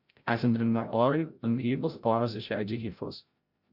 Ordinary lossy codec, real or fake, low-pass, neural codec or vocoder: Opus, 64 kbps; fake; 5.4 kHz; codec, 16 kHz, 0.5 kbps, FreqCodec, larger model